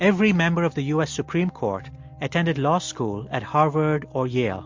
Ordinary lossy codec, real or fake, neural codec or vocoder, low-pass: MP3, 48 kbps; real; none; 7.2 kHz